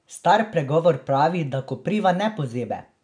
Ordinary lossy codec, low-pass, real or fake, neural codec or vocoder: none; 9.9 kHz; real; none